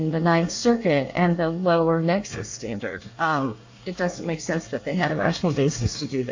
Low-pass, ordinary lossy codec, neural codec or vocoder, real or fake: 7.2 kHz; AAC, 48 kbps; codec, 24 kHz, 1 kbps, SNAC; fake